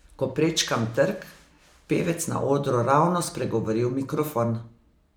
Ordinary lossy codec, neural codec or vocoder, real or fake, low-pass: none; none; real; none